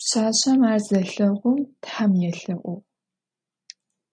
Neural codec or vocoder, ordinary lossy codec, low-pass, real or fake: none; MP3, 96 kbps; 9.9 kHz; real